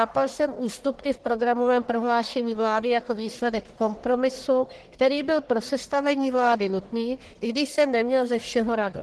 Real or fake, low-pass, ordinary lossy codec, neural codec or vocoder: fake; 10.8 kHz; Opus, 16 kbps; codec, 44.1 kHz, 1.7 kbps, Pupu-Codec